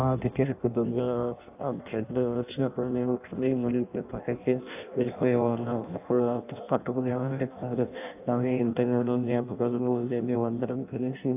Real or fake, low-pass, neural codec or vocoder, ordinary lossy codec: fake; 3.6 kHz; codec, 16 kHz in and 24 kHz out, 0.6 kbps, FireRedTTS-2 codec; AAC, 32 kbps